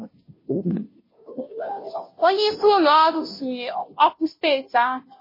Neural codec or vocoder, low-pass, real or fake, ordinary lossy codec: codec, 16 kHz, 0.5 kbps, FunCodec, trained on Chinese and English, 25 frames a second; 5.4 kHz; fake; MP3, 24 kbps